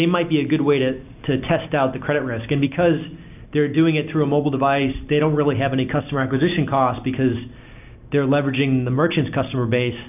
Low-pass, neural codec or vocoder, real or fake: 3.6 kHz; none; real